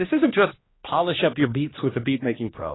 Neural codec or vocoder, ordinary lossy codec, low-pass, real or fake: codec, 16 kHz, 1 kbps, X-Codec, HuBERT features, trained on general audio; AAC, 16 kbps; 7.2 kHz; fake